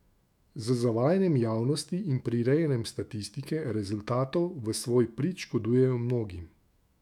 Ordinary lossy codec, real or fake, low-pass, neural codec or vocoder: none; fake; 19.8 kHz; autoencoder, 48 kHz, 128 numbers a frame, DAC-VAE, trained on Japanese speech